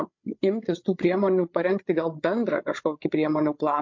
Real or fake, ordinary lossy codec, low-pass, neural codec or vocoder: fake; MP3, 48 kbps; 7.2 kHz; codec, 16 kHz, 8 kbps, FreqCodec, larger model